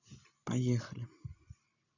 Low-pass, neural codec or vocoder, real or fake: 7.2 kHz; none; real